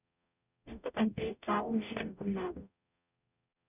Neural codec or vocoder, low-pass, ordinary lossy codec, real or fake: codec, 44.1 kHz, 0.9 kbps, DAC; 3.6 kHz; none; fake